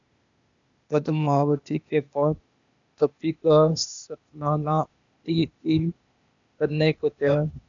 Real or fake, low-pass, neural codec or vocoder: fake; 7.2 kHz; codec, 16 kHz, 0.8 kbps, ZipCodec